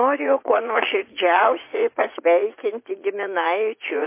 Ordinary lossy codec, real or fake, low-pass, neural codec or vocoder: MP3, 24 kbps; fake; 3.6 kHz; vocoder, 44.1 kHz, 80 mel bands, Vocos